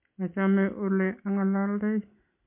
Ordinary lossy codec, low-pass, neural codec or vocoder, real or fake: MP3, 32 kbps; 3.6 kHz; none; real